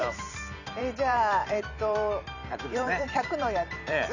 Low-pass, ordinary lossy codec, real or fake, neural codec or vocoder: 7.2 kHz; none; fake; vocoder, 44.1 kHz, 128 mel bands every 256 samples, BigVGAN v2